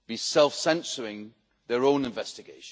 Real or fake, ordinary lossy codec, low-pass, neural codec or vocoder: real; none; none; none